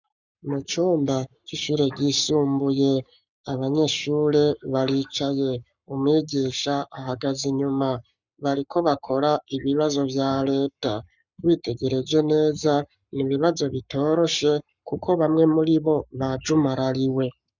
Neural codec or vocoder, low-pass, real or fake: codec, 44.1 kHz, 7.8 kbps, Pupu-Codec; 7.2 kHz; fake